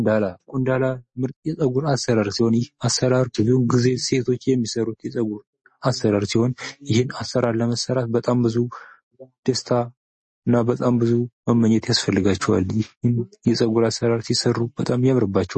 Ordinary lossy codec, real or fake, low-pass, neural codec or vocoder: MP3, 32 kbps; real; 10.8 kHz; none